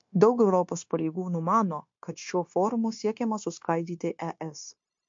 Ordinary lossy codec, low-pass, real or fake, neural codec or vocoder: MP3, 48 kbps; 7.2 kHz; fake; codec, 16 kHz, 0.9 kbps, LongCat-Audio-Codec